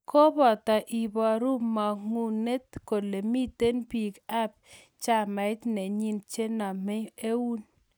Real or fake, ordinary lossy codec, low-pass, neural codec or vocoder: real; none; none; none